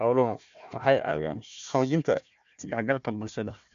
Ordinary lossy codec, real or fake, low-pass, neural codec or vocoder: MP3, 64 kbps; fake; 7.2 kHz; codec, 16 kHz, 1 kbps, FunCodec, trained on Chinese and English, 50 frames a second